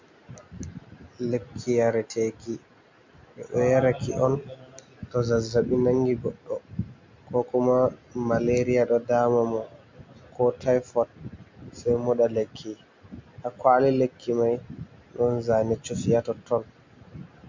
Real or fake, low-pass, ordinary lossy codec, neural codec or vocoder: real; 7.2 kHz; MP3, 48 kbps; none